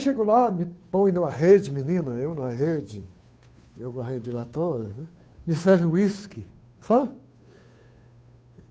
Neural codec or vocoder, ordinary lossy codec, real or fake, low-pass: codec, 16 kHz, 2 kbps, FunCodec, trained on Chinese and English, 25 frames a second; none; fake; none